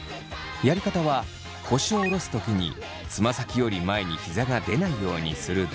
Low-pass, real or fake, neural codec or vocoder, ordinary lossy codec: none; real; none; none